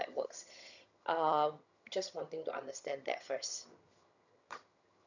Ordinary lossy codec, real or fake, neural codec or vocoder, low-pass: none; fake; vocoder, 22.05 kHz, 80 mel bands, HiFi-GAN; 7.2 kHz